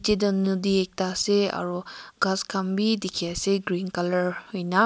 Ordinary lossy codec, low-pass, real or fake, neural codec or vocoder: none; none; real; none